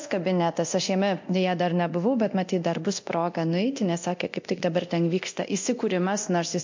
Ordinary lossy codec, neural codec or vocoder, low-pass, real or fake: MP3, 48 kbps; codec, 24 kHz, 0.9 kbps, DualCodec; 7.2 kHz; fake